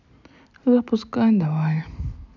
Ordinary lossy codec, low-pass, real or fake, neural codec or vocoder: none; 7.2 kHz; real; none